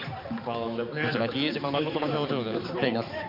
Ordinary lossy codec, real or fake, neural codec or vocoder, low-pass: MP3, 48 kbps; fake; codec, 16 kHz, 4 kbps, X-Codec, HuBERT features, trained on balanced general audio; 5.4 kHz